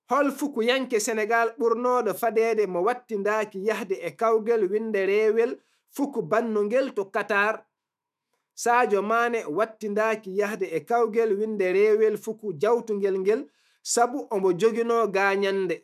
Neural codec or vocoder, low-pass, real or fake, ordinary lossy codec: autoencoder, 48 kHz, 128 numbers a frame, DAC-VAE, trained on Japanese speech; 14.4 kHz; fake; none